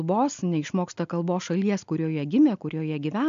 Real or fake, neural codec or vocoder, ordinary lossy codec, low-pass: real; none; MP3, 64 kbps; 7.2 kHz